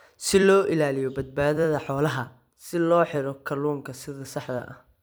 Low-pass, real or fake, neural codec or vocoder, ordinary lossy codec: none; fake; vocoder, 44.1 kHz, 128 mel bands every 256 samples, BigVGAN v2; none